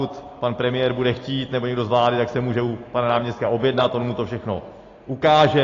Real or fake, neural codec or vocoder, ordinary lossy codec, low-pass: real; none; AAC, 32 kbps; 7.2 kHz